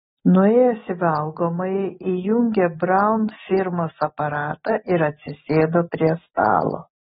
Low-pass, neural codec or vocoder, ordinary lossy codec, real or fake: 19.8 kHz; none; AAC, 16 kbps; real